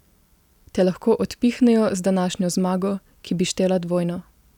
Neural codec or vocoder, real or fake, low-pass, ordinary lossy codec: none; real; 19.8 kHz; none